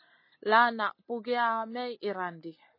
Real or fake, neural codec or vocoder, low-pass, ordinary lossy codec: real; none; 5.4 kHz; MP3, 24 kbps